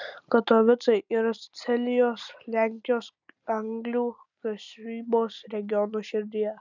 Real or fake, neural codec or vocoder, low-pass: real; none; 7.2 kHz